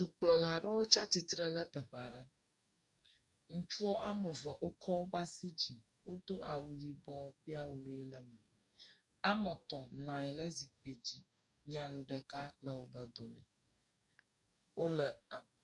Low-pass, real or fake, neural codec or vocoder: 10.8 kHz; fake; codec, 44.1 kHz, 2.6 kbps, DAC